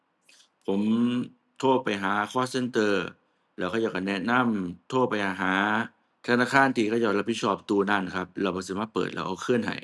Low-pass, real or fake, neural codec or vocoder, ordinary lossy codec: none; real; none; none